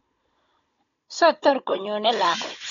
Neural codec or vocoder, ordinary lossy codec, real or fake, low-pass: codec, 16 kHz, 16 kbps, FunCodec, trained on Chinese and English, 50 frames a second; AAC, 32 kbps; fake; 7.2 kHz